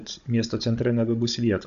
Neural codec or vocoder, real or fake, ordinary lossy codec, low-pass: codec, 16 kHz, 16 kbps, FunCodec, trained on Chinese and English, 50 frames a second; fake; AAC, 96 kbps; 7.2 kHz